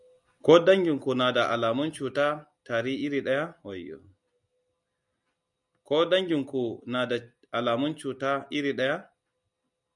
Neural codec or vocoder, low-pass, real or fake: none; 10.8 kHz; real